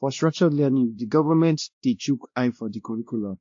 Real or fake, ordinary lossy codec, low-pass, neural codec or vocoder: fake; AAC, 48 kbps; 7.2 kHz; codec, 16 kHz, 1 kbps, X-Codec, WavLM features, trained on Multilingual LibriSpeech